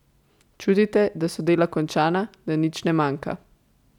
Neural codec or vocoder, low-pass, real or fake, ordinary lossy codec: none; 19.8 kHz; real; none